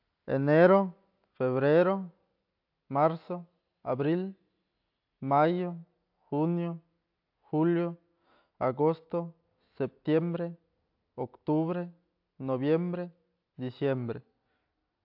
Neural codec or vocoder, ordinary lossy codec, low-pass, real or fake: none; AAC, 48 kbps; 5.4 kHz; real